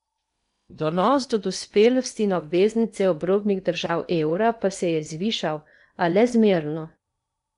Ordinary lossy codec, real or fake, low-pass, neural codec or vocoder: none; fake; 10.8 kHz; codec, 16 kHz in and 24 kHz out, 0.8 kbps, FocalCodec, streaming, 65536 codes